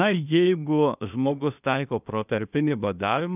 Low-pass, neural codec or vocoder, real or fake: 3.6 kHz; codec, 16 kHz, 0.8 kbps, ZipCodec; fake